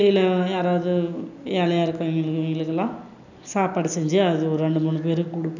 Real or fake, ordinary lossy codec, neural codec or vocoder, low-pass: real; none; none; 7.2 kHz